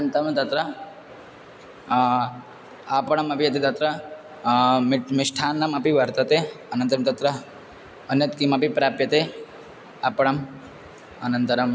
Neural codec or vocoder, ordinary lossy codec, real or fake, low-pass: none; none; real; none